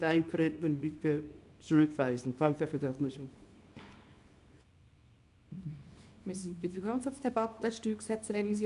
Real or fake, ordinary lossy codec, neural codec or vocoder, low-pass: fake; none; codec, 24 kHz, 0.9 kbps, WavTokenizer, small release; 10.8 kHz